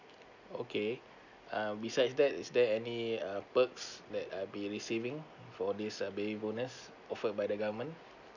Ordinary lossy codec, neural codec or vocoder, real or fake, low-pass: none; none; real; 7.2 kHz